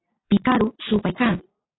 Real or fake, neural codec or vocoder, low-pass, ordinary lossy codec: real; none; 7.2 kHz; AAC, 16 kbps